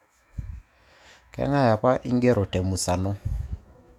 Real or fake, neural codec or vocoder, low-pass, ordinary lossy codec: fake; autoencoder, 48 kHz, 128 numbers a frame, DAC-VAE, trained on Japanese speech; 19.8 kHz; none